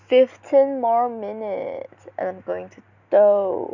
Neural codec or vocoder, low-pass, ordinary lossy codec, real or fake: none; 7.2 kHz; none; real